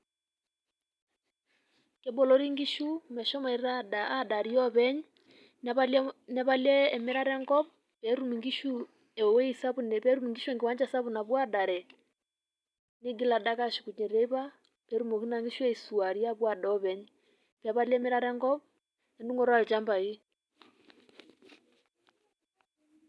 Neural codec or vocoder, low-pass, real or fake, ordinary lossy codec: none; none; real; none